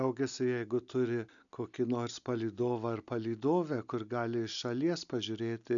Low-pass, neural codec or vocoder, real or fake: 7.2 kHz; none; real